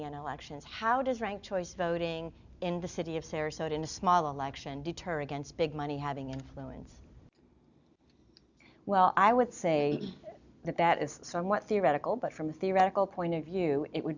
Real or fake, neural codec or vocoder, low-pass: real; none; 7.2 kHz